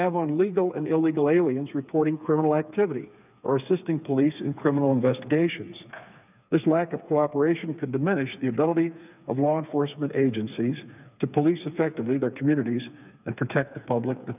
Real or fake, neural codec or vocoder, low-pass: fake; codec, 16 kHz, 4 kbps, FreqCodec, smaller model; 3.6 kHz